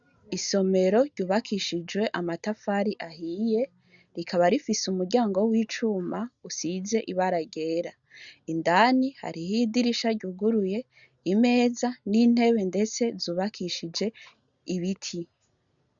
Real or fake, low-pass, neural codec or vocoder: real; 7.2 kHz; none